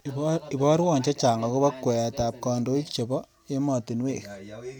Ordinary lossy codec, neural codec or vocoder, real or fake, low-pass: none; none; real; none